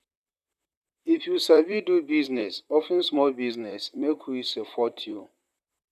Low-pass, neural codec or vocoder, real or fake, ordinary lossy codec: 14.4 kHz; vocoder, 44.1 kHz, 128 mel bands, Pupu-Vocoder; fake; none